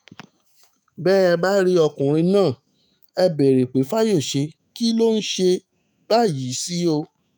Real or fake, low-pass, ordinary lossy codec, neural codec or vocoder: fake; none; none; autoencoder, 48 kHz, 128 numbers a frame, DAC-VAE, trained on Japanese speech